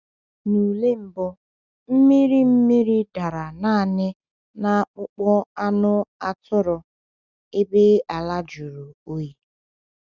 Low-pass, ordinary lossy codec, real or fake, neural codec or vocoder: 7.2 kHz; Opus, 64 kbps; real; none